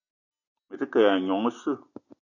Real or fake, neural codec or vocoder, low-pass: real; none; 7.2 kHz